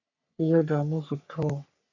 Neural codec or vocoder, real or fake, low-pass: codec, 44.1 kHz, 3.4 kbps, Pupu-Codec; fake; 7.2 kHz